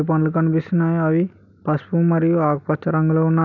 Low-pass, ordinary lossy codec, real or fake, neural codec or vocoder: 7.2 kHz; none; real; none